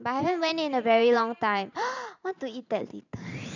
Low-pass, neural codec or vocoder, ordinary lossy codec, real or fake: 7.2 kHz; vocoder, 44.1 kHz, 128 mel bands every 512 samples, BigVGAN v2; none; fake